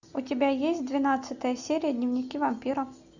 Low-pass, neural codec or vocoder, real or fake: 7.2 kHz; none; real